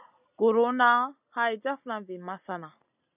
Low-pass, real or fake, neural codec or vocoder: 3.6 kHz; real; none